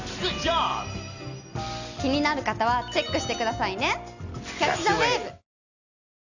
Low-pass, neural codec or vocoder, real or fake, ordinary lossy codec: 7.2 kHz; none; real; none